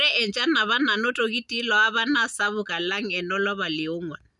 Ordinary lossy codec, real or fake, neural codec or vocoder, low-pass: none; real; none; 10.8 kHz